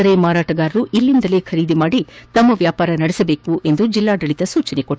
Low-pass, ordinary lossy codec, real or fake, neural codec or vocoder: none; none; fake; codec, 16 kHz, 6 kbps, DAC